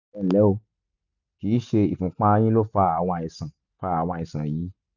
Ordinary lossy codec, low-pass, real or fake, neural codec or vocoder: none; 7.2 kHz; real; none